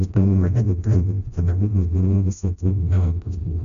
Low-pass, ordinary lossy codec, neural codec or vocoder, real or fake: 7.2 kHz; none; codec, 16 kHz, 0.5 kbps, FreqCodec, smaller model; fake